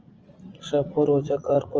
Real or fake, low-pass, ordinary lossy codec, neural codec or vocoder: fake; 7.2 kHz; Opus, 24 kbps; vocoder, 44.1 kHz, 80 mel bands, Vocos